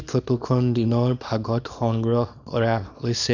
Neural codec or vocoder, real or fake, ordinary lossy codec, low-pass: codec, 24 kHz, 0.9 kbps, WavTokenizer, small release; fake; none; 7.2 kHz